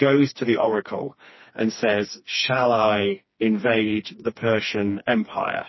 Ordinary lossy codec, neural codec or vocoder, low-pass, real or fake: MP3, 24 kbps; codec, 16 kHz, 2 kbps, FreqCodec, smaller model; 7.2 kHz; fake